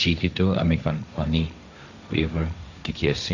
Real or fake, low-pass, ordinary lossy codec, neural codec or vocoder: fake; 7.2 kHz; none; codec, 16 kHz, 1.1 kbps, Voila-Tokenizer